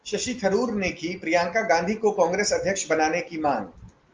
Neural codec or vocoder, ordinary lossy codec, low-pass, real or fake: none; Opus, 32 kbps; 7.2 kHz; real